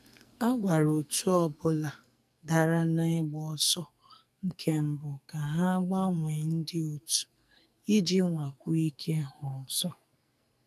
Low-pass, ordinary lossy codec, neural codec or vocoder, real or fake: 14.4 kHz; none; codec, 44.1 kHz, 2.6 kbps, SNAC; fake